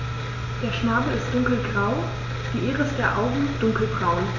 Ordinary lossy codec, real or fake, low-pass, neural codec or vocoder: AAC, 32 kbps; real; 7.2 kHz; none